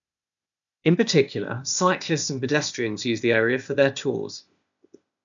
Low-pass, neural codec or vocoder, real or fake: 7.2 kHz; codec, 16 kHz, 0.8 kbps, ZipCodec; fake